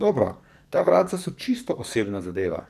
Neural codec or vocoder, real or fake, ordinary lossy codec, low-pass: codec, 44.1 kHz, 2.6 kbps, SNAC; fake; MP3, 96 kbps; 14.4 kHz